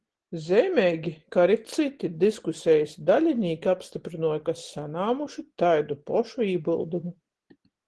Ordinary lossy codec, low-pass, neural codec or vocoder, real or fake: Opus, 16 kbps; 10.8 kHz; none; real